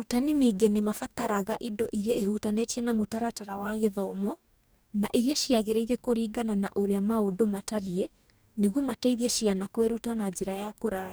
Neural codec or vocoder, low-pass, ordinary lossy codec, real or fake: codec, 44.1 kHz, 2.6 kbps, DAC; none; none; fake